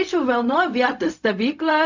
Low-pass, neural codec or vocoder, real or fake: 7.2 kHz; codec, 16 kHz, 0.4 kbps, LongCat-Audio-Codec; fake